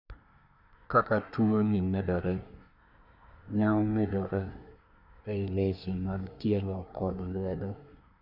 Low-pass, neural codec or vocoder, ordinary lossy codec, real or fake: 5.4 kHz; codec, 24 kHz, 1 kbps, SNAC; none; fake